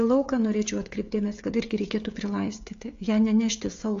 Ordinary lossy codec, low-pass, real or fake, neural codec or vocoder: AAC, 48 kbps; 7.2 kHz; fake; codec, 16 kHz, 16 kbps, FreqCodec, smaller model